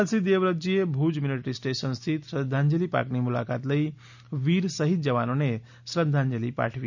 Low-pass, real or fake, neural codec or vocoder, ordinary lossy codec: 7.2 kHz; real; none; none